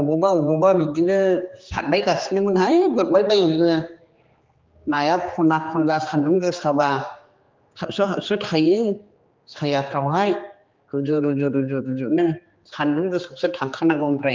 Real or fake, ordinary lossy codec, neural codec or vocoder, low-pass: fake; Opus, 32 kbps; codec, 16 kHz, 2 kbps, X-Codec, HuBERT features, trained on general audio; 7.2 kHz